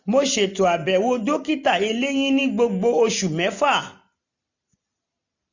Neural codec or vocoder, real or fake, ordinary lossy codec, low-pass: none; real; none; 7.2 kHz